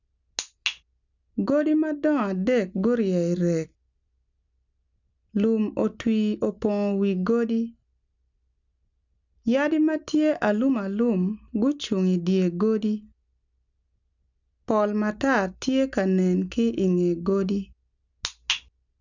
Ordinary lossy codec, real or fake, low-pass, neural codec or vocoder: none; real; 7.2 kHz; none